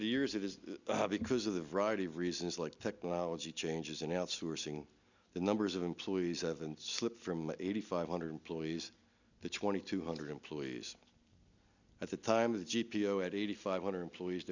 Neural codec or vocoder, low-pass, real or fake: none; 7.2 kHz; real